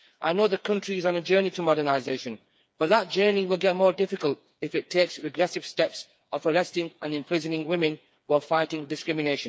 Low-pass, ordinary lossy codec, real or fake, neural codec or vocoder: none; none; fake; codec, 16 kHz, 4 kbps, FreqCodec, smaller model